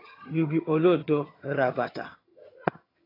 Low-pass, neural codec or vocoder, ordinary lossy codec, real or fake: 5.4 kHz; vocoder, 22.05 kHz, 80 mel bands, HiFi-GAN; AAC, 24 kbps; fake